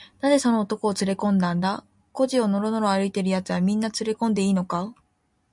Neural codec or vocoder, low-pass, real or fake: none; 10.8 kHz; real